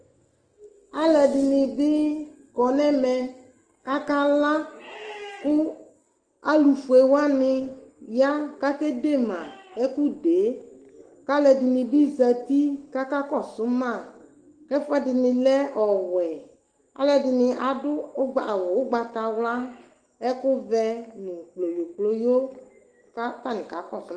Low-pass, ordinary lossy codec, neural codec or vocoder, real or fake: 9.9 kHz; Opus, 16 kbps; autoencoder, 48 kHz, 128 numbers a frame, DAC-VAE, trained on Japanese speech; fake